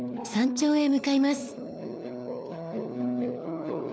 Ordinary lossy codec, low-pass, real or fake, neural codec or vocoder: none; none; fake; codec, 16 kHz, 4 kbps, FunCodec, trained on LibriTTS, 50 frames a second